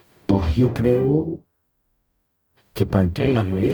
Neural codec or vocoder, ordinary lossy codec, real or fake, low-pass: codec, 44.1 kHz, 0.9 kbps, DAC; none; fake; none